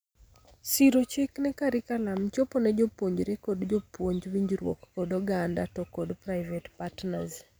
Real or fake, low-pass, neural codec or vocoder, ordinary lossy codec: real; none; none; none